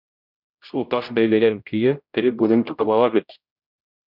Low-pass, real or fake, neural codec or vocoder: 5.4 kHz; fake; codec, 16 kHz, 0.5 kbps, X-Codec, HuBERT features, trained on general audio